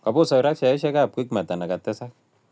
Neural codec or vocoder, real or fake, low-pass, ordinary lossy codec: none; real; none; none